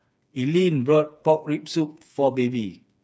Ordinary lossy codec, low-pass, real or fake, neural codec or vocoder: none; none; fake; codec, 16 kHz, 4 kbps, FreqCodec, smaller model